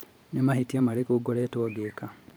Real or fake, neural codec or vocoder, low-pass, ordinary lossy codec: fake; vocoder, 44.1 kHz, 128 mel bands every 512 samples, BigVGAN v2; none; none